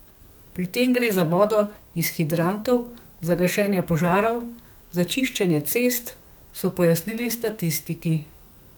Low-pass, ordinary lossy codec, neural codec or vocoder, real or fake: none; none; codec, 44.1 kHz, 2.6 kbps, SNAC; fake